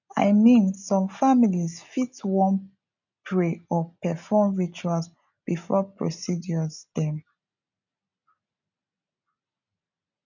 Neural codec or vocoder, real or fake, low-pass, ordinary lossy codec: none; real; 7.2 kHz; none